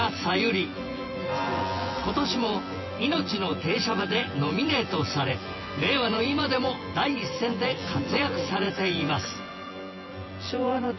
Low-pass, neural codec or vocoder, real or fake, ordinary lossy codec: 7.2 kHz; vocoder, 24 kHz, 100 mel bands, Vocos; fake; MP3, 24 kbps